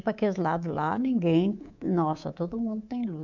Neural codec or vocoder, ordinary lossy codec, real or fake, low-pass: codec, 24 kHz, 3.1 kbps, DualCodec; none; fake; 7.2 kHz